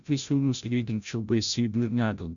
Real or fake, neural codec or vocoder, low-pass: fake; codec, 16 kHz, 0.5 kbps, FreqCodec, larger model; 7.2 kHz